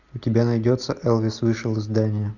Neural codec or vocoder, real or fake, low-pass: none; real; 7.2 kHz